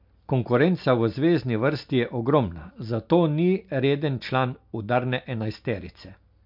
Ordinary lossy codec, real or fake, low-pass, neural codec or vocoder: MP3, 48 kbps; real; 5.4 kHz; none